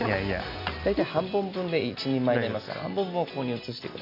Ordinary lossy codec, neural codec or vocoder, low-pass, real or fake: none; none; 5.4 kHz; real